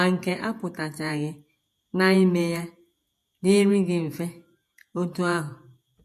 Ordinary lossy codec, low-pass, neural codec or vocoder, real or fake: MP3, 64 kbps; 14.4 kHz; vocoder, 48 kHz, 128 mel bands, Vocos; fake